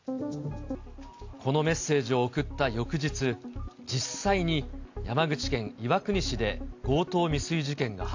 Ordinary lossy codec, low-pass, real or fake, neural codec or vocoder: AAC, 48 kbps; 7.2 kHz; real; none